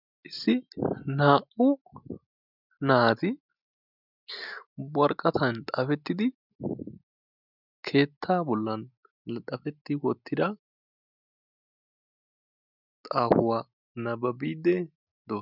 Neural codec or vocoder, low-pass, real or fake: none; 5.4 kHz; real